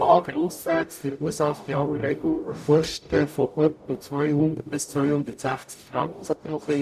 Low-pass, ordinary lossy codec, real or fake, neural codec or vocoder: 14.4 kHz; none; fake; codec, 44.1 kHz, 0.9 kbps, DAC